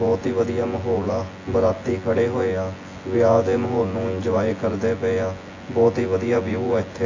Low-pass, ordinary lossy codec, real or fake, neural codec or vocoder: 7.2 kHz; AAC, 32 kbps; fake; vocoder, 24 kHz, 100 mel bands, Vocos